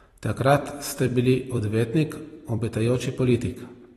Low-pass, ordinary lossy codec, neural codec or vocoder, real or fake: 19.8 kHz; AAC, 32 kbps; vocoder, 44.1 kHz, 128 mel bands every 256 samples, BigVGAN v2; fake